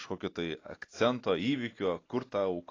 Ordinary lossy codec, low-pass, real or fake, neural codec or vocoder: AAC, 32 kbps; 7.2 kHz; real; none